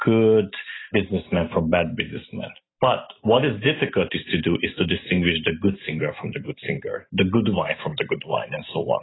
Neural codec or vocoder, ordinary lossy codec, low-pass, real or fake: none; AAC, 16 kbps; 7.2 kHz; real